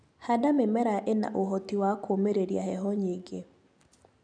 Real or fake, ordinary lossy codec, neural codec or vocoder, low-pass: real; none; none; 9.9 kHz